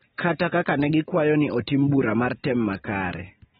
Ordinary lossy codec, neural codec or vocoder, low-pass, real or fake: AAC, 16 kbps; none; 19.8 kHz; real